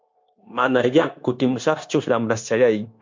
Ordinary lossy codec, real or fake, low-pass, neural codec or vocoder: MP3, 48 kbps; fake; 7.2 kHz; codec, 16 kHz, 0.9 kbps, LongCat-Audio-Codec